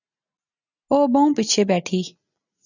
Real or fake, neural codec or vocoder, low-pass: real; none; 7.2 kHz